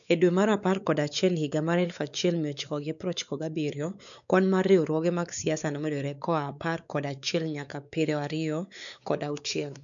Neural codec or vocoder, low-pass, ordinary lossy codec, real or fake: codec, 16 kHz, 4 kbps, X-Codec, WavLM features, trained on Multilingual LibriSpeech; 7.2 kHz; none; fake